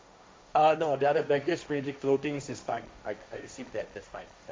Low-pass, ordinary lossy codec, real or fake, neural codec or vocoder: none; none; fake; codec, 16 kHz, 1.1 kbps, Voila-Tokenizer